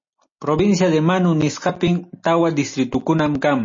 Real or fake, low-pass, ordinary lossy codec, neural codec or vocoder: real; 7.2 kHz; MP3, 32 kbps; none